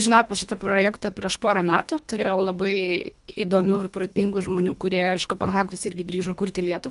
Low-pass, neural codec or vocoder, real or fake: 10.8 kHz; codec, 24 kHz, 1.5 kbps, HILCodec; fake